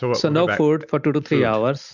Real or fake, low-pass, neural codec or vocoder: real; 7.2 kHz; none